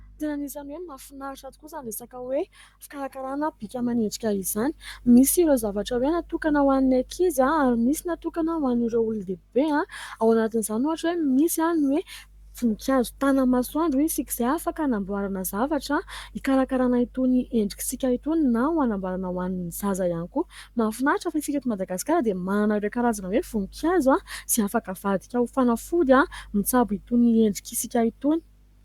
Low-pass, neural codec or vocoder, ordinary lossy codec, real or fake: 19.8 kHz; codec, 44.1 kHz, 7.8 kbps, Pupu-Codec; Opus, 64 kbps; fake